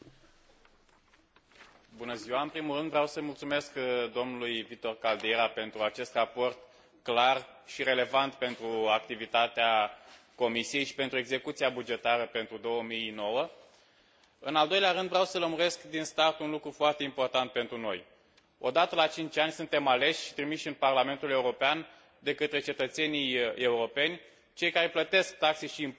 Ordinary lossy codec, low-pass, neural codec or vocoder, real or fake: none; none; none; real